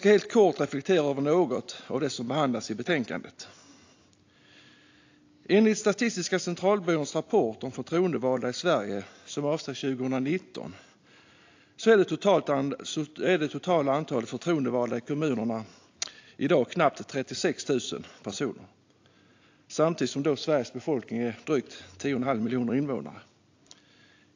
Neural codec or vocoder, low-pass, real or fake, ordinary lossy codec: none; 7.2 kHz; real; AAC, 48 kbps